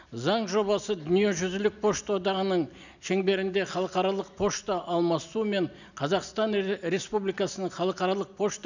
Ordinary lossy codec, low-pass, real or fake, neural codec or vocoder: none; 7.2 kHz; real; none